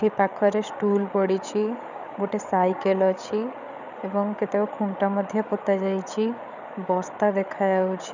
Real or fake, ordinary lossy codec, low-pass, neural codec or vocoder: fake; none; 7.2 kHz; codec, 16 kHz, 8 kbps, FreqCodec, larger model